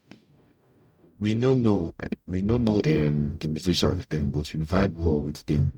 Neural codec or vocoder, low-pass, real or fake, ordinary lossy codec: codec, 44.1 kHz, 0.9 kbps, DAC; 19.8 kHz; fake; none